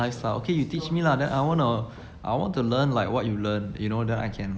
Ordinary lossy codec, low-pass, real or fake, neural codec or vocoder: none; none; real; none